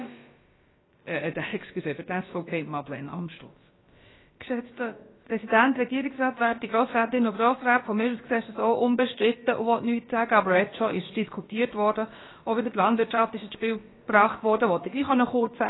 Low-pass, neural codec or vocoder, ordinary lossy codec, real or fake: 7.2 kHz; codec, 16 kHz, about 1 kbps, DyCAST, with the encoder's durations; AAC, 16 kbps; fake